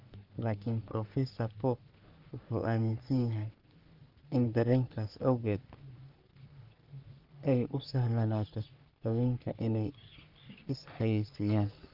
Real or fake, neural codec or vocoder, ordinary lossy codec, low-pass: fake; codec, 44.1 kHz, 3.4 kbps, Pupu-Codec; Opus, 32 kbps; 5.4 kHz